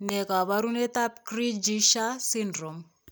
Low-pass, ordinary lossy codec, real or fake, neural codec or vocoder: none; none; real; none